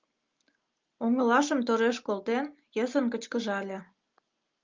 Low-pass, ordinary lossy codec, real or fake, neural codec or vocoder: 7.2 kHz; Opus, 24 kbps; real; none